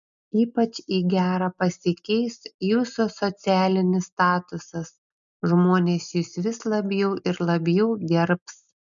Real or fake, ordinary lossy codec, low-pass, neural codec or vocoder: real; MP3, 96 kbps; 7.2 kHz; none